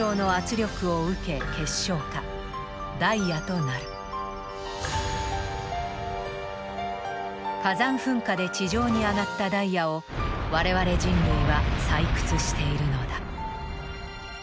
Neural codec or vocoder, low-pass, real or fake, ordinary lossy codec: none; none; real; none